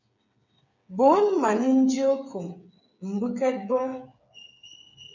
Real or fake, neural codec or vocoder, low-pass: fake; codec, 16 kHz, 8 kbps, FreqCodec, smaller model; 7.2 kHz